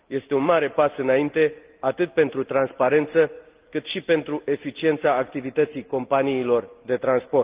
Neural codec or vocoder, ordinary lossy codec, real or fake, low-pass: none; Opus, 32 kbps; real; 3.6 kHz